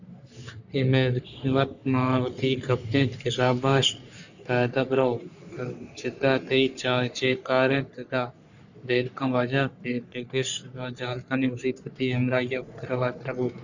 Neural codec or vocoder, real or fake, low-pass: codec, 44.1 kHz, 3.4 kbps, Pupu-Codec; fake; 7.2 kHz